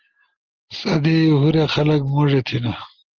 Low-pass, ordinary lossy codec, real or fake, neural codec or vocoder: 7.2 kHz; Opus, 16 kbps; real; none